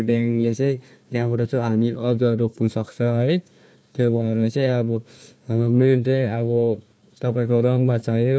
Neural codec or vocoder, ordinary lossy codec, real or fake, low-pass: codec, 16 kHz, 1 kbps, FunCodec, trained on Chinese and English, 50 frames a second; none; fake; none